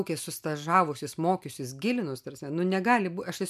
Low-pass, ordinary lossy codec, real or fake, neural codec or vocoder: 14.4 kHz; MP3, 96 kbps; real; none